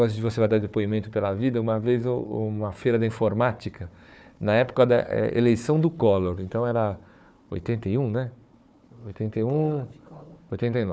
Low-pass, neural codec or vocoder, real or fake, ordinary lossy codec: none; codec, 16 kHz, 4 kbps, FunCodec, trained on Chinese and English, 50 frames a second; fake; none